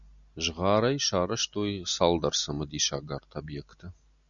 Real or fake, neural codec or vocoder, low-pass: real; none; 7.2 kHz